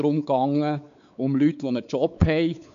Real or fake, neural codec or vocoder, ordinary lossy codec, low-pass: fake; codec, 16 kHz, 4 kbps, X-Codec, WavLM features, trained on Multilingual LibriSpeech; none; 7.2 kHz